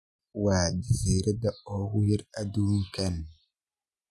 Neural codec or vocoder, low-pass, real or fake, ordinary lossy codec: none; none; real; none